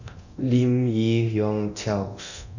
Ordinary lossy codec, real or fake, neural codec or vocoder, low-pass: none; fake; codec, 24 kHz, 0.9 kbps, DualCodec; 7.2 kHz